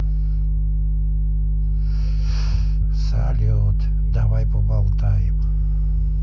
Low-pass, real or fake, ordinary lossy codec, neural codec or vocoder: none; real; none; none